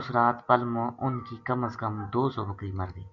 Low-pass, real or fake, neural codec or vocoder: 7.2 kHz; real; none